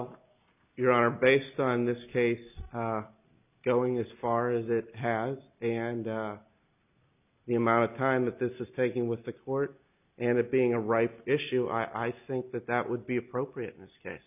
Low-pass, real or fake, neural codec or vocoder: 3.6 kHz; real; none